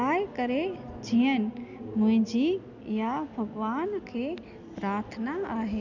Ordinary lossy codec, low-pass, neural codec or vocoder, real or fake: none; 7.2 kHz; none; real